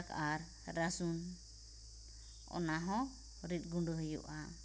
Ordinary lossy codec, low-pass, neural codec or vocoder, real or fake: none; none; none; real